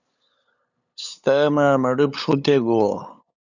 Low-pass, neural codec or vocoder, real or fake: 7.2 kHz; codec, 16 kHz, 8 kbps, FunCodec, trained on LibriTTS, 25 frames a second; fake